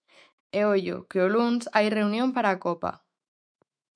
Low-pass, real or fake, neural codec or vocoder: 9.9 kHz; fake; autoencoder, 48 kHz, 128 numbers a frame, DAC-VAE, trained on Japanese speech